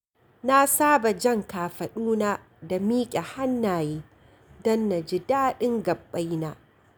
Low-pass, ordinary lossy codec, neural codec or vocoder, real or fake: none; none; none; real